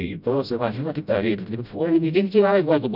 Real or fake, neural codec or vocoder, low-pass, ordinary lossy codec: fake; codec, 16 kHz, 0.5 kbps, FreqCodec, smaller model; 5.4 kHz; none